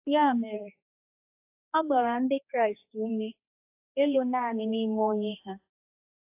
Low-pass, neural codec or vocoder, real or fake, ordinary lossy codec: 3.6 kHz; codec, 16 kHz, 2 kbps, X-Codec, HuBERT features, trained on general audio; fake; AAC, 32 kbps